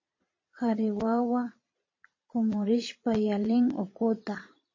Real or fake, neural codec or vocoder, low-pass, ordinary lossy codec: fake; vocoder, 22.05 kHz, 80 mel bands, Vocos; 7.2 kHz; MP3, 32 kbps